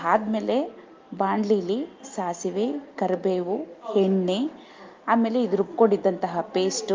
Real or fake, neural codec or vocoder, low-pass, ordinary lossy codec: real; none; 7.2 kHz; Opus, 32 kbps